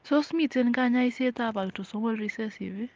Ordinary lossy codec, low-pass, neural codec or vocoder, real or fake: Opus, 32 kbps; 7.2 kHz; none; real